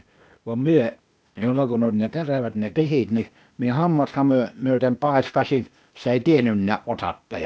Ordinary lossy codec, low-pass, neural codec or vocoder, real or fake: none; none; codec, 16 kHz, 0.8 kbps, ZipCodec; fake